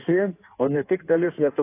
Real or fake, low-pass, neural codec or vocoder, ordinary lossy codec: fake; 3.6 kHz; codec, 16 kHz in and 24 kHz out, 1.1 kbps, FireRedTTS-2 codec; AAC, 24 kbps